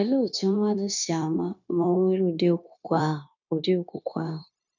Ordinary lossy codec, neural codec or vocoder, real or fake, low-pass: none; codec, 16 kHz, 0.9 kbps, LongCat-Audio-Codec; fake; 7.2 kHz